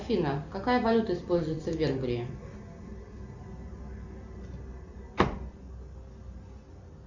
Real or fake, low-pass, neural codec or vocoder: real; 7.2 kHz; none